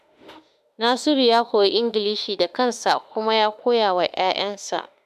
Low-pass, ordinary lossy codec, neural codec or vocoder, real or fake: 14.4 kHz; none; autoencoder, 48 kHz, 32 numbers a frame, DAC-VAE, trained on Japanese speech; fake